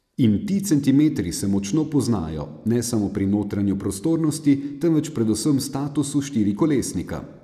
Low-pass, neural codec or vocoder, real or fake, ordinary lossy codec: 14.4 kHz; none; real; none